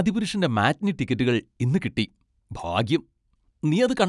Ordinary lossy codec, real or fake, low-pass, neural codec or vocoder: none; real; 10.8 kHz; none